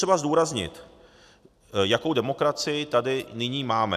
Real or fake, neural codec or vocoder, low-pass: real; none; 14.4 kHz